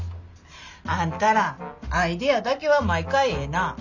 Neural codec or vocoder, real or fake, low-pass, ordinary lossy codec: none; real; 7.2 kHz; none